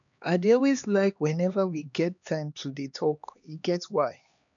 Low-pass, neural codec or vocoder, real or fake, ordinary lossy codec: 7.2 kHz; codec, 16 kHz, 2 kbps, X-Codec, HuBERT features, trained on LibriSpeech; fake; none